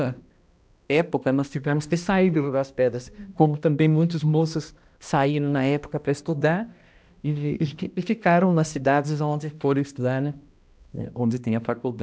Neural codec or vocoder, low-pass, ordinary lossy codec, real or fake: codec, 16 kHz, 1 kbps, X-Codec, HuBERT features, trained on balanced general audio; none; none; fake